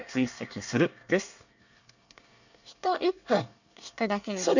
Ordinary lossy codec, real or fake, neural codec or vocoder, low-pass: none; fake; codec, 24 kHz, 1 kbps, SNAC; 7.2 kHz